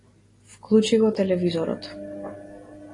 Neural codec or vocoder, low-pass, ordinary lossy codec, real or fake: none; 10.8 kHz; AAC, 32 kbps; real